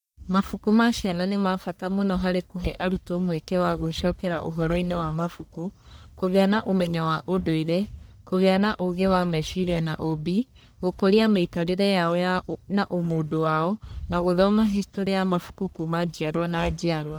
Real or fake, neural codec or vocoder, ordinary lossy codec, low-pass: fake; codec, 44.1 kHz, 1.7 kbps, Pupu-Codec; none; none